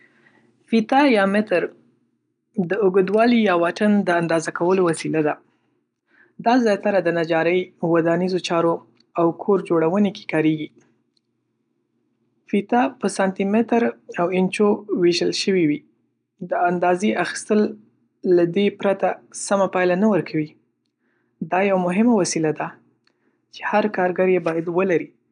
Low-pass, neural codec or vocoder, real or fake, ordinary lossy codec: 9.9 kHz; none; real; none